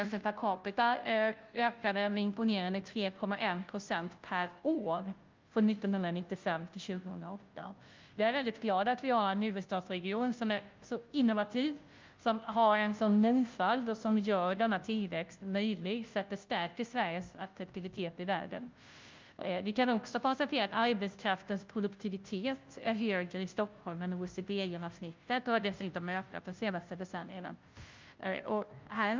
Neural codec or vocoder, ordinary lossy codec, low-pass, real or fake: codec, 16 kHz, 0.5 kbps, FunCodec, trained on Chinese and English, 25 frames a second; Opus, 24 kbps; 7.2 kHz; fake